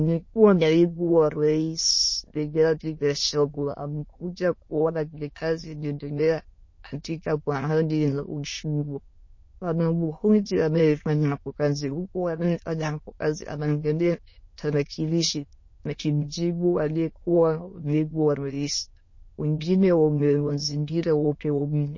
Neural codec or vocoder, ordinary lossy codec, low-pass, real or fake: autoencoder, 22.05 kHz, a latent of 192 numbers a frame, VITS, trained on many speakers; MP3, 32 kbps; 7.2 kHz; fake